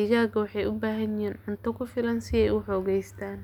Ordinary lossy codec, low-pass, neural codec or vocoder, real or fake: none; 19.8 kHz; none; real